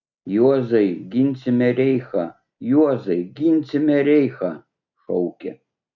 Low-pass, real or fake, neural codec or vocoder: 7.2 kHz; real; none